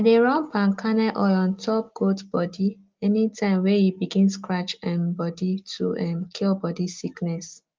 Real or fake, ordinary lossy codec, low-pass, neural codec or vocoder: real; Opus, 32 kbps; 7.2 kHz; none